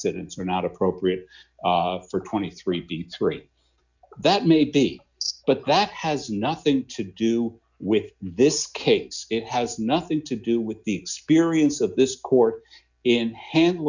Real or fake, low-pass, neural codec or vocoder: real; 7.2 kHz; none